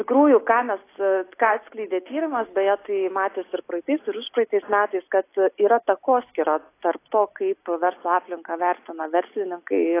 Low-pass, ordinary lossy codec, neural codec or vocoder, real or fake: 3.6 kHz; AAC, 24 kbps; none; real